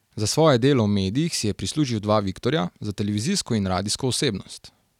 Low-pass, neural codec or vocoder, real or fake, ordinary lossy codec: 19.8 kHz; none; real; none